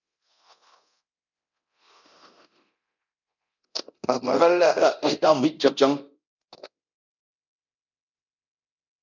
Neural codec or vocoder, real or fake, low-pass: codec, 24 kHz, 0.9 kbps, DualCodec; fake; 7.2 kHz